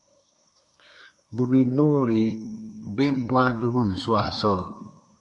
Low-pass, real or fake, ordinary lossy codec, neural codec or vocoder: 10.8 kHz; fake; MP3, 96 kbps; codec, 24 kHz, 1 kbps, SNAC